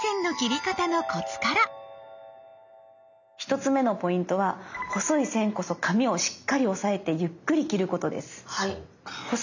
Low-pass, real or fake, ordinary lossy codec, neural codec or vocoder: 7.2 kHz; real; none; none